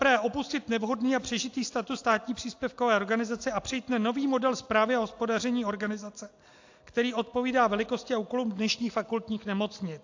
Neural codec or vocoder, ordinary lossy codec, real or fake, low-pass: none; AAC, 48 kbps; real; 7.2 kHz